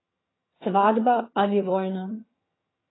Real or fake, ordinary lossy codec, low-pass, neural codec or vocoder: fake; AAC, 16 kbps; 7.2 kHz; vocoder, 22.05 kHz, 80 mel bands, HiFi-GAN